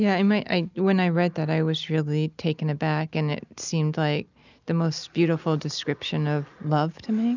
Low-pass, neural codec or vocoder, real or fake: 7.2 kHz; none; real